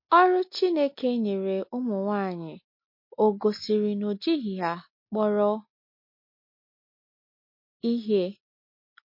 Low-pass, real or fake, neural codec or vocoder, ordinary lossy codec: 5.4 kHz; real; none; MP3, 32 kbps